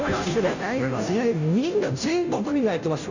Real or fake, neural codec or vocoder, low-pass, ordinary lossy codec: fake; codec, 16 kHz, 0.5 kbps, FunCodec, trained on Chinese and English, 25 frames a second; 7.2 kHz; none